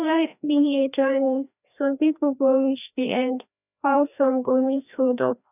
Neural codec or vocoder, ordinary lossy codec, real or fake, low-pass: codec, 16 kHz, 1 kbps, FreqCodec, larger model; none; fake; 3.6 kHz